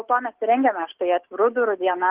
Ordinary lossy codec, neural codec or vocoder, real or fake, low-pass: Opus, 16 kbps; none; real; 3.6 kHz